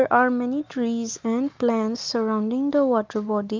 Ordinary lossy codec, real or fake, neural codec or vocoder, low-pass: Opus, 24 kbps; real; none; 7.2 kHz